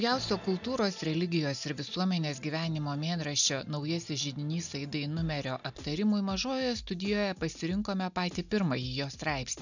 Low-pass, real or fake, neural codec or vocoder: 7.2 kHz; real; none